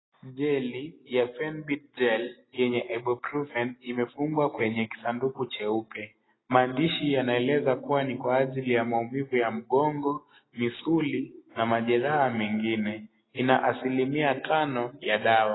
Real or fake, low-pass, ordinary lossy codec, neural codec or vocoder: real; 7.2 kHz; AAC, 16 kbps; none